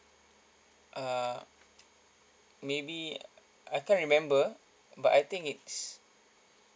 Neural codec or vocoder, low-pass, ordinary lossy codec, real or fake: none; none; none; real